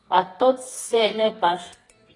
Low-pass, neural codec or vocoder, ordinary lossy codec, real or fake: 10.8 kHz; codec, 24 kHz, 0.9 kbps, WavTokenizer, medium music audio release; AAC, 32 kbps; fake